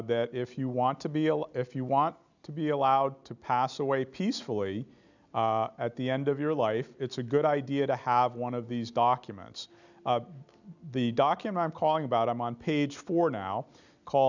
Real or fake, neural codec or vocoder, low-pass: real; none; 7.2 kHz